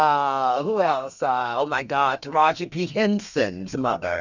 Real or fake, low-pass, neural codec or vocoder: fake; 7.2 kHz; codec, 32 kHz, 1.9 kbps, SNAC